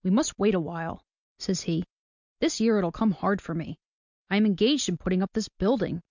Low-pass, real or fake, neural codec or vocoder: 7.2 kHz; real; none